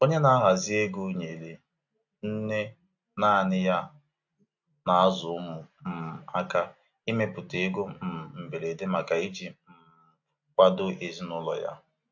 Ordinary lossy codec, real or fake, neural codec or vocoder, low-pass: AAC, 48 kbps; real; none; 7.2 kHz